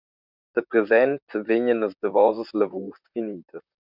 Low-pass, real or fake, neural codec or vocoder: 5.4 kHz; fake; vocoder, 44.1 kHz, 128 mel bands, Pupu-Vocoder